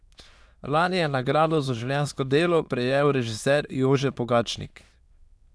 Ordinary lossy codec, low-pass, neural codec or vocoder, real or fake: none; none; autoencoder, 22.05 kHz, a latent of 192 numbers a frame, VITS, trained on many speakers; fake